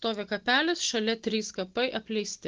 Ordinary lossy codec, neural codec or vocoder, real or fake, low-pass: Opus, 16 kbps; none; real; 7.2 kHz